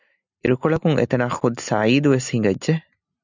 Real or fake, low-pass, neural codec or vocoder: real; 7.2 kHz; none